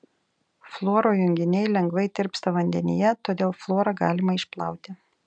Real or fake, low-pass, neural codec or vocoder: real; 9.9 kHz; none